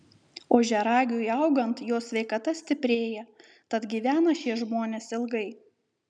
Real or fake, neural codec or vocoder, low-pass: real; none; 9.9 kHz